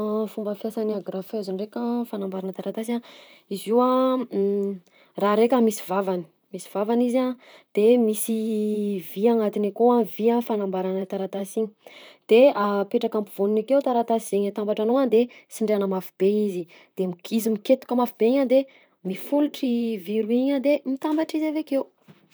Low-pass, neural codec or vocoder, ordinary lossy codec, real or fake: none; vocoder, 44.1 kHz, 128 mel bands, Pupu-Vocoder; none; fake